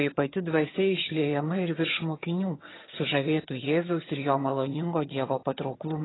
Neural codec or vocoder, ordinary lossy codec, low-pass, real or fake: vocoder, 22.05 kHz, 80 mel bands, HiFi-GAN; AAC, 16 kbps; 7.2 kHz; fake